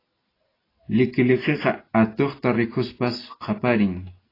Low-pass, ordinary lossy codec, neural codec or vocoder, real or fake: 5.4 kHz; AAC, 24 kbps; none; real